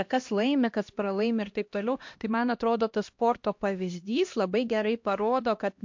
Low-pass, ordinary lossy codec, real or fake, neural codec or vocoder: 7.2 kHz; MP3, 48 kbps; fake; codec, 16 kHz, 1 kbps, X-Codec, HuBERT features, trained on LibriSpeech